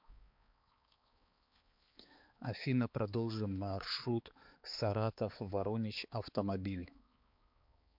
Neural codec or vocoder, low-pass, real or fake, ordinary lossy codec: codec, 16 kHz, 4 kbps, X-Codec, HuBERT features, trained on balanced general audio; 5.4 kHz; fake; MP3, 48 kbps